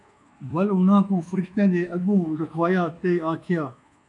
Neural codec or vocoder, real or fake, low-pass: codec, 24 kHz, 1.2 kbps, DualCodec; fake; 10.8 kHz